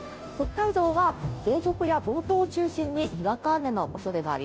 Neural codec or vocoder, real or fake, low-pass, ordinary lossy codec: codec, 16 kHz, 0.5 kbps, FunCodec, trained on Chinese and English, 25 frames a second; fake; none; none